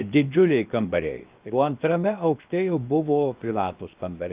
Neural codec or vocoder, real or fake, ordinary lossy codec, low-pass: codec, 16 kHz, 0.7 kbps, FocalCodec; fake; Opus, 24 kbps; 3.6 kHz